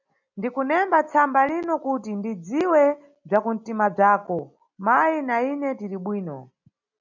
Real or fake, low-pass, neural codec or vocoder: real; 7.2 kHz; none